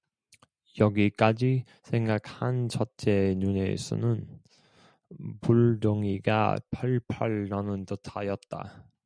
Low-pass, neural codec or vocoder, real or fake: 9.9 kHz; none; real